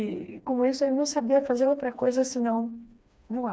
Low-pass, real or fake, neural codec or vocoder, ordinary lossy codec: none; fake; codec, 16 kHz, 2 kbps, FreqCodec, smaller model; none